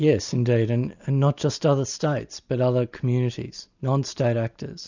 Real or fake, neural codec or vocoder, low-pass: real; none; 7.2 kHz